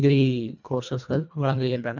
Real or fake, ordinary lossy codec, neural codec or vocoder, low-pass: fake; none; codec, 24 kHz, 1.5 kbps, HILCodec; 7.2 kHz